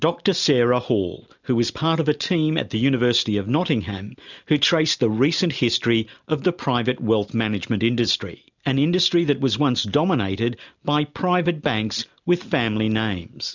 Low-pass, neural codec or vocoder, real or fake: 7.2 kHz; none; real